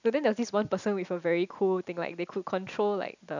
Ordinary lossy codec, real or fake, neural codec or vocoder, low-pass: none; real; none; 7.2 kHz